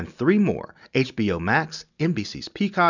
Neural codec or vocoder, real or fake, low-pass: none; real; 7.2 kHz